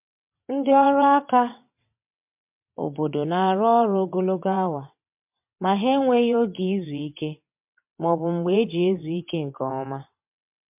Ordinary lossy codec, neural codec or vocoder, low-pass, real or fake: MP3, 32 kbps; vocoder, 22.05 kHz, 80 mel bands, WaveNeXt; 3.6 kHz; fake